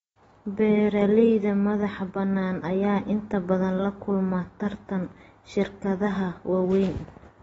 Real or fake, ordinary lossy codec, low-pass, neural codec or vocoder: real; AAC, 24 kbps; 19.8 kHz; none